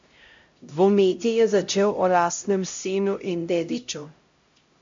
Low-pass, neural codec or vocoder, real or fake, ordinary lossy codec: 7.2 kHz; codec, 16 kHz, 0.5 kbps, X-Codec, HuBERT features, trained on LibriSpeech; fake; MP3, 48 kbps